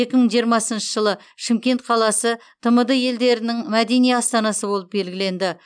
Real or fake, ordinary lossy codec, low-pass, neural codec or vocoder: real; none; 9.9 kHz; none